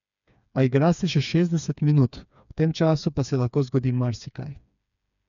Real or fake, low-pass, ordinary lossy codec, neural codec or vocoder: fake; 7.2 kHz; none; codec, 16 kHz, 4 kbps, FreqCodec, smaller model